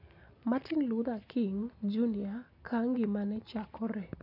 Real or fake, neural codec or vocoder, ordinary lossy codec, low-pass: real; none; none; 5.4 kHz